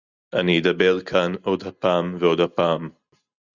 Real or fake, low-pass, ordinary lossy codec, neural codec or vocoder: real; 7.2 kHz; Opus, 64 kbps; none